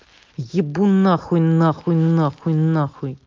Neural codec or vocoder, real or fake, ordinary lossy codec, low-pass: none; real; Opus, 24 kbps; 7.2 kHz